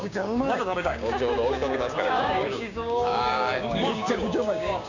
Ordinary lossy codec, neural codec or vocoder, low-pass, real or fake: none; codec, 16 kHz, 6 kbps, DAC; 7.2 kHz; fake